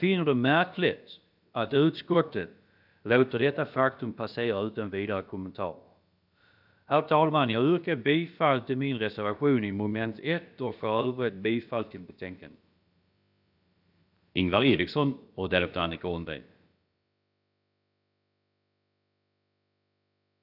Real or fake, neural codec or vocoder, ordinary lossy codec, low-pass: fake; codec, 16 kHz, about 1 kbps, DyCAST, with the encoder's durations; none; 5.4 kHz